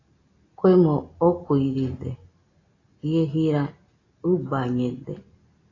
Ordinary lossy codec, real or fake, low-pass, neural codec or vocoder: AAC, 32 kbps; real; 7.2 kHz; none